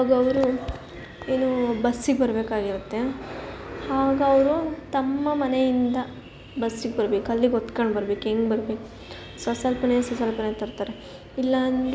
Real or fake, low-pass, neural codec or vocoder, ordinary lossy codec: real; none; none; none